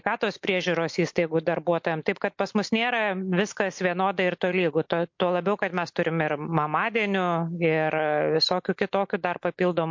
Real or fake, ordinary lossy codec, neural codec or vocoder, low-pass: real; MP3, 48 kbps; none; 7.2 kHz